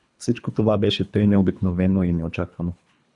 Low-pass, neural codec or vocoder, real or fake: 10.8 kHz; codec, 24 kHz, 3 kbps, HILCodec; fake